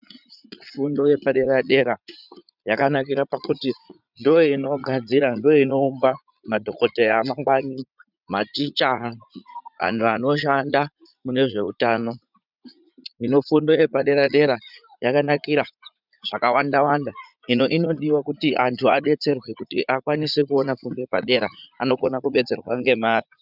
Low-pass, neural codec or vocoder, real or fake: 5.4 kHz; vocoder, 44.1 kHz, 80 mel bands, Vocos; fake